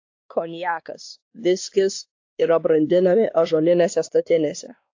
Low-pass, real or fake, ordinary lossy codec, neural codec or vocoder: 7.2 kHz; fake; AAC, 48 kbps; codec, 16 kHz, 2 kbps, X-Codec, WavLM features, trained on Multilingual LibriSpeech